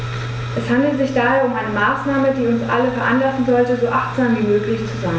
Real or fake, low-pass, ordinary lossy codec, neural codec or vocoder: real; none; none; none